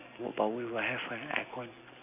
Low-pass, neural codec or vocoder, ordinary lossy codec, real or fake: 3.6 kHz; none; none; real